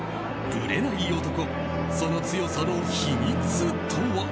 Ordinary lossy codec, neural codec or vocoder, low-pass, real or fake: none; none; none; real